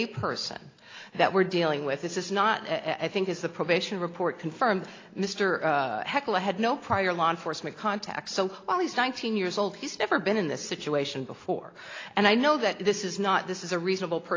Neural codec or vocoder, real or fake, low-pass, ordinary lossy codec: none; real; 7.2 kHz; AAC, 32 kbps